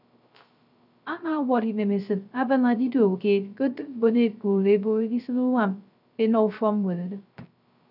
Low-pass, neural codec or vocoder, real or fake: 5.4 kHz; codec, 16 kHz, 0.3 kbps, FocalCodec; fake